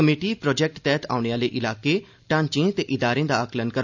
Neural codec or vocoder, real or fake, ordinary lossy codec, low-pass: none; real; none; 7.2 kHz